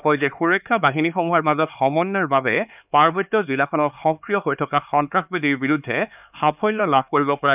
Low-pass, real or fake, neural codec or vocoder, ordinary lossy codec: 3.6 kHz; fake; codec, 16 kHz, 4 kbps, X-Codec, HuBERT features, trained on LibriSpeech; none